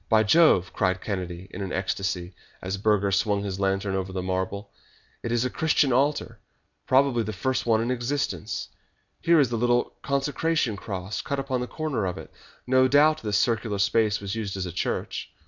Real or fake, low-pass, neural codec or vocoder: real; 7.2 kHz; none